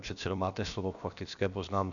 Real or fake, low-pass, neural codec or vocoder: fake; 7.2 kHz; codec, 16 kHz, about 1 kbps, DyCAST, with the encoder's durations